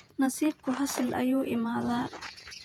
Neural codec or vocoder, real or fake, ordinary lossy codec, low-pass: vocoder, 48 kHz, 128 mel bands, Vocos; fake; none; 19.8 kHz